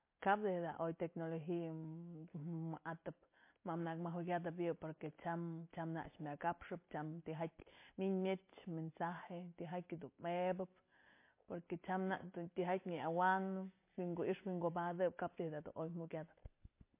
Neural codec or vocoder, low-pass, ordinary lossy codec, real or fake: none; 3.6 kHz; MP3, 24 kbps; real